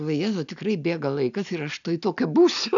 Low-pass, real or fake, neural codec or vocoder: 7.2 kHz; real; none